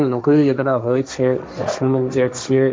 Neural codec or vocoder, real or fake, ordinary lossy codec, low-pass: codec, 16 kHz, 1.1 kbps, Voila-Tokenizer; fake; none; none